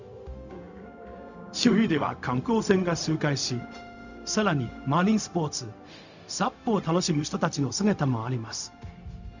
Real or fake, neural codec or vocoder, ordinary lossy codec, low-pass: fake; codec, 16 kHz, 0.4 kbps, LongCat-Audio-Codec; none; 7.2 kHz